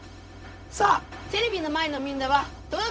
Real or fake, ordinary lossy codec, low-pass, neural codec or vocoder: fake; none; none; codec, 16 kHz, 0.4 kbps, LongCat-Audio-Codec